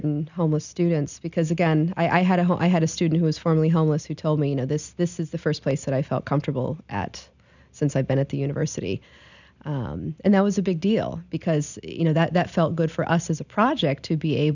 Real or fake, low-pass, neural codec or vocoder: real; 7.2 kHz; none